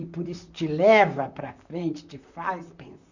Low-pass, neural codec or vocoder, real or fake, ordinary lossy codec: 7.2 kHz; vocoder, 44.1 kHz, 128 mel bands, Pupu-Vocoder; fake; none